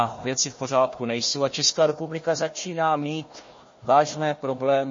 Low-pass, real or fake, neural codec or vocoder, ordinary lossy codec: 7.2 kHz; fake; codec, 16 kHz, 1 kbps, FunCodec, trained on Chinese and English, 50 frames a second; MP3, 32 kbps